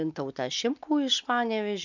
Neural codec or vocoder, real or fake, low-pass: none; real; 7.2 kHz